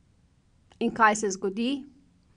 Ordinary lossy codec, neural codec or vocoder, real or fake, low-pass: none; none; real; 9.9 kHz